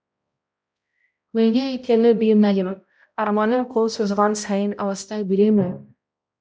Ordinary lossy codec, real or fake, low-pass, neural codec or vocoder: none; fake; none; codec, 16 kHz, 0.5 kbps, X-Codec, HuBERT features, trained on balanced general audio